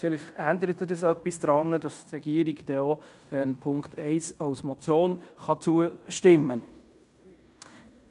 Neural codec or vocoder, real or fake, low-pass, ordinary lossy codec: codec, 16 kHz in and 24 kHz out, 0.9 kbps, LongCat-Audio-Codec, fine tuned four codebook decoder; fake; 10.8 kHz; none